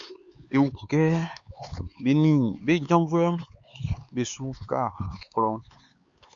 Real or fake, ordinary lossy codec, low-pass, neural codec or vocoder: fake; Opus, 64 kbps; 7.2 kHz; codec, 16 kHz, 4 kbps, X-Codec, HuBERT features, trained on LibriSpeech